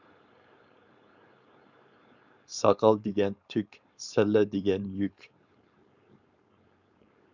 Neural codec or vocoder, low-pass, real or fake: codec, 16 kHz, 4.8 kbps, FACodec; 7.2 kHz; fake